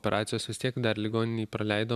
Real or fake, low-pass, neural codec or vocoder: real; 14.4 kHz; none